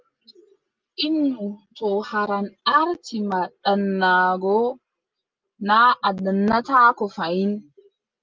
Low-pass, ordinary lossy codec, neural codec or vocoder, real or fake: 7.2 kHz; Opus, 24 kbps; none; real